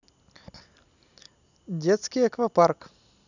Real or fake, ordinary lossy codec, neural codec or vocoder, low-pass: real; none; none; 7.2 kHz